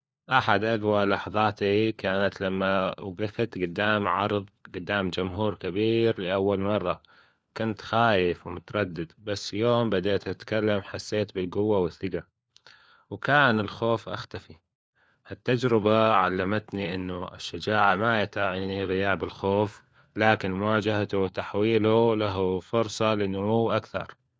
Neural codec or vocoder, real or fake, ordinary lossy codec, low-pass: codec, 16 kHz, 4 kbps, FunCodec, trained on LibriTTS, 50 frames a second; fake; none; none